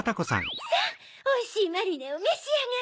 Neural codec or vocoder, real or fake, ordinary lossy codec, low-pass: none; real; none; none